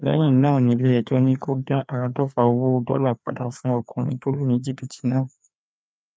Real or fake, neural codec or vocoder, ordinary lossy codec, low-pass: fake; codec, 16 kHz, 2 kbps, FreqCodec, larger model; none; none